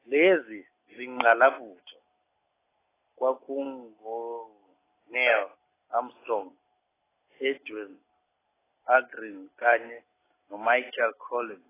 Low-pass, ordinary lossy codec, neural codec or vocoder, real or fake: 3.6 kHz; AAC, 16 kbps; none; real